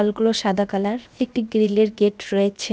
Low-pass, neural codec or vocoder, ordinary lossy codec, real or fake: none; codec, 16 kHz, 0.7 kbps, FocalCodec; none; fake